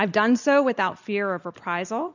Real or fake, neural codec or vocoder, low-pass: real; none; 7.2 kHz